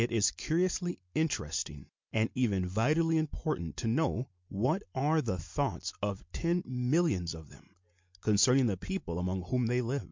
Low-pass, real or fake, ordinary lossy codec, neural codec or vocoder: 7.2 kHz; real; MP3, 64 kbps; none